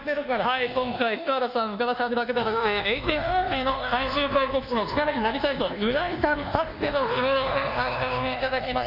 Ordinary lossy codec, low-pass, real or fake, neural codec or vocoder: none; 5.4 kHz; fake; codec, 24 kHz, 1.2 kbps, DualCodec